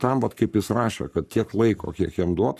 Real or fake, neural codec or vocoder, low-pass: fake; codec, 44.1 kHz, 7.8 kbps, Pupu-Codec; 14.4 kHz